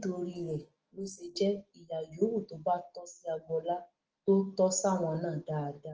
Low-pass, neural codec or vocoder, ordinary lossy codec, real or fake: 7.2 kHz; autoencoder, 48 kHz, 128 numbers a frame, DAC-VAE, trained on Japanese speech; Opus, 16 kbps; fake